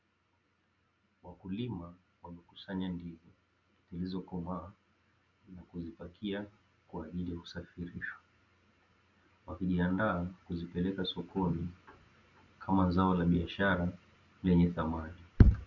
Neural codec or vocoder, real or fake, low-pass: none; real; 7.2 kHz